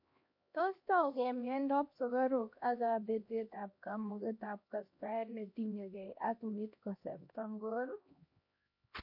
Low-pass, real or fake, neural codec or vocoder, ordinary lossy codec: 5.4 kHz; fake; codec, 16 kHz, 1 kbps, X-Codec, HuBERT features, trained on LibriSpeech; MP3, 32 kbps